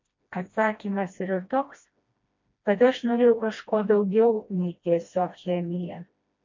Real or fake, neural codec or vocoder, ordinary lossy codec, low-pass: fake; codec, 16 kHz, 1 kbps, FreqCodec, smaller model; MP3, 48 kbps; 7.2 kHz